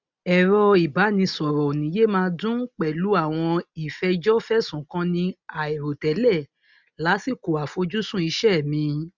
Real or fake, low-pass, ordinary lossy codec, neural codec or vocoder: real; 7.2 kHz; none; none